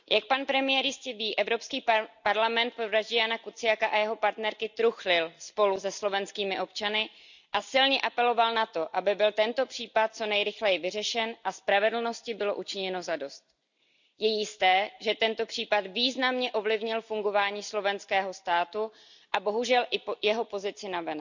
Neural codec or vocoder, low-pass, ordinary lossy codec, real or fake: none; 7.2 kHz; none; real